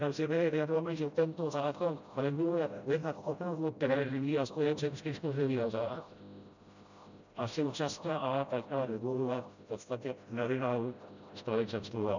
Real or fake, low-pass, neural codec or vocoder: fake; 7.2 kHz; codec, 16 kHz, 0.5 kbps, FreqCodec, smaller model